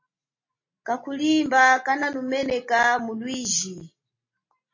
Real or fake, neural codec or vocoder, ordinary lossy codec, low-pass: real; none; MP3, 32 kbps; 7.2 kHz